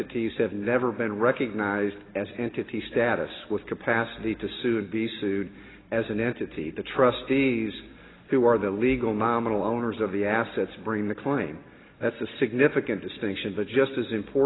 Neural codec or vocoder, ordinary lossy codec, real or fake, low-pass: none; AAC, 16 kbps; real; 7.2 kHz